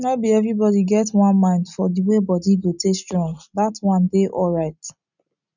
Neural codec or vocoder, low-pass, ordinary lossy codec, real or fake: none; 7.2 kHz; none; real